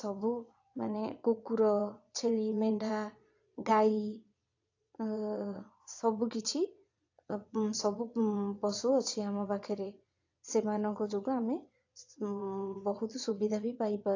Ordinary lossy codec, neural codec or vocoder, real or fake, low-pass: AAC, 48 kbps; vocoder, 22.05 kHz, 80 mel bands, Vocos; fake; 7.2 kHz